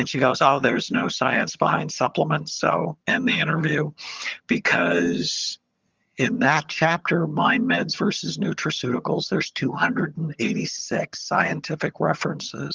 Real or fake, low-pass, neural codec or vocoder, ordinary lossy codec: fake; 7.2 kHz; vocoder, 22.05 kHz, 80 mel bands, HiFi-GAN; Opus, 32 kbps